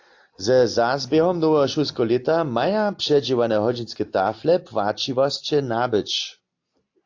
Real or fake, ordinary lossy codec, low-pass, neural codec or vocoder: real; AAC, 48 kbps; 7.2 kHz; none